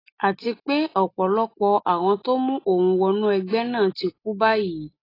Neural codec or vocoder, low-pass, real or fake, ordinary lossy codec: none; 5.4 kHz; real; AAC, 24 kbps